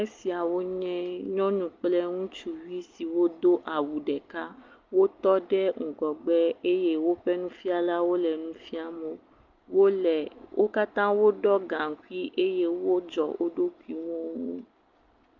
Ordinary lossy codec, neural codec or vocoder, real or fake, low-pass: Opus, 24 kbps; none; real; 7.2 kHz